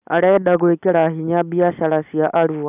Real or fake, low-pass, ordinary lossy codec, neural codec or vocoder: real; 3.6 kHz; none; none